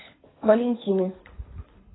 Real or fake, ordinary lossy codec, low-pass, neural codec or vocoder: fake; AAC, 16 kbps; 7.2 kHz; codec, 24 kHz, 6 kbps, HILCodec